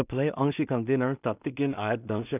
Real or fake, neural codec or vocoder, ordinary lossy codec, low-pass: fake; codec, 16 kHz in and 24 kHz out, 0.4 kbps, LongCat-Audio-Codec, two codebook decoder; none; 3.6 kHz